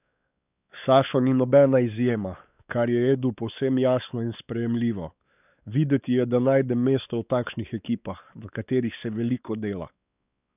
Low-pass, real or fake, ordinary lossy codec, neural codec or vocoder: 3.6 kHz; fake; none; codec, 16 kHz, 4 kbps, X-Codec, WavLM features, trained on Multilingual LibriSpeech